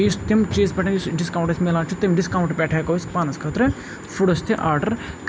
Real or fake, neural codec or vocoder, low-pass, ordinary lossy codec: real; none; none; none